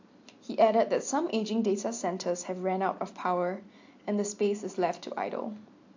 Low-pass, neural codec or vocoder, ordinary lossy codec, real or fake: 7.2 kHz; none; AAC, 48 kbps; real